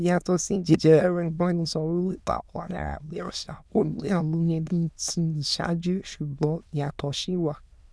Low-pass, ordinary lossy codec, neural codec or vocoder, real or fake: none; none; autoencoder, 22.05 kHz, a latent of 192 numbers a frame, VITS, trained on many speakers; fake